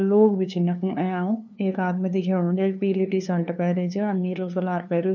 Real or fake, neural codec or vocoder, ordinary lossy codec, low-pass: fake; codec, 16 kHz, 2 kbps, FunCodec, trained on LibriTTS, 25 frames a second; none; 7.2 kHz